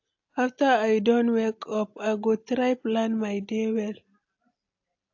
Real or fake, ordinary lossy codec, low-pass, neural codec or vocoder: real; AAC, 48 kbps; 7.2 kHz; none